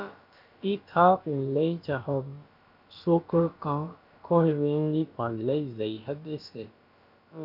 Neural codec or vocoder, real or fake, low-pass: codec, 16 kHz, about 1 kbps, DyCAST, with the encoder's durations; fake; 5.4 kHz